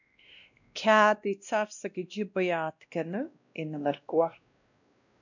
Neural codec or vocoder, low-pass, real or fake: codec, 16 kHz, 1 kbps, X-Codec, WavLM features, trained on Multilingual LibriSpeech; 7.2 kHz; fake